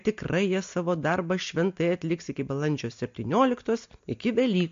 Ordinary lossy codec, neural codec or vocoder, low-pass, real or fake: MP3, 48 kbps; none; 7.2 kHz; real